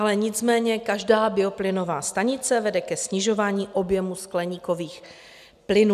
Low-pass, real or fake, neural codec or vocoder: 14.4 kHz; real; none